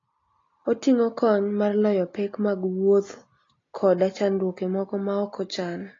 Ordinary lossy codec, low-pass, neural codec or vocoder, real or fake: AAC, 32 kbps; 7.2 kHz; none; real